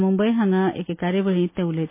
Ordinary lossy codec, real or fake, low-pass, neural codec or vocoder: MP3, 24 kbps; real; 3.6 kHz; none